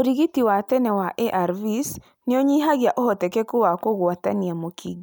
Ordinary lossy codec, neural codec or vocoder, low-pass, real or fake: none; none; none; real